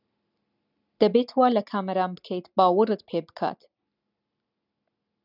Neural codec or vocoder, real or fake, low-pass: none; real; 5.4 kHz